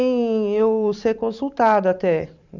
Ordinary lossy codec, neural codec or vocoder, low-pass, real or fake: none; vocoder, 44.1 kHz, 128 mel bands every 512 samples, BigVGAN v2; 7.2 kHz; fake